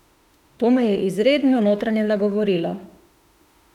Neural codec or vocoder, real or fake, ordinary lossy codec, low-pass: autoencoder, 48 kHz, 32 numbers a frame, DAC-VAE, trained on Japanese speech; fake; none; 19.8 kHz